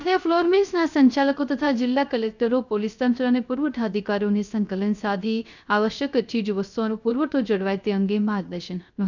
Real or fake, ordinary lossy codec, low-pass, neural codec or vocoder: fake; none; 7.2 kHz; codec, 16 kHz, 0.3 kbps, FocalCodec